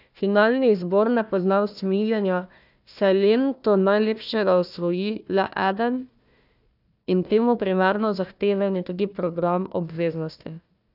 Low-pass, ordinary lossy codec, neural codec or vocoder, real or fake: 5.4 kHz; none; codec, 16 kHz, 1 kbps, FunCodec, trained on Chinese and English, 50 frames a second; fake